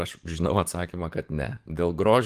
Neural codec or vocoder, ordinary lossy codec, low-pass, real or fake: codec, 44.1 kHz, 7.8 kbps, DAC; Opus, 24 kbps; 14.4 kHz; fake